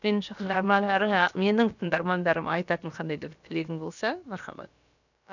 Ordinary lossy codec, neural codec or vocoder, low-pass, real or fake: none; codec, 16 kHz, about 1 kbps, DyCAST, with the encoder's durations; 7.2 kHz; fake